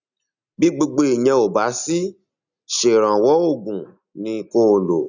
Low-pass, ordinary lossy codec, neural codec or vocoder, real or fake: 7.2 kHz; none; none; real